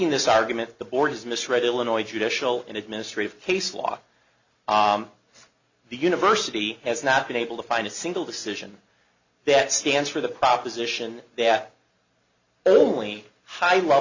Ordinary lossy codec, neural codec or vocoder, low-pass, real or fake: Opus, 64 kbps; none; 7.2 kHz; real